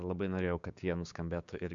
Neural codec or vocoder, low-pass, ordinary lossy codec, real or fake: codec, 16 kHz, 6 kbps, DAC; 7.2 kHz; MP3, 64 kbps; fake